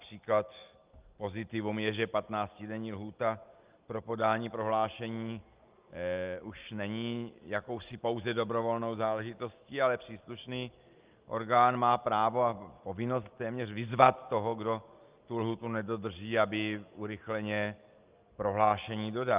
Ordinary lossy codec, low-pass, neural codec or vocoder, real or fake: Opus, 24 kbps; 3.6 kHz; none; real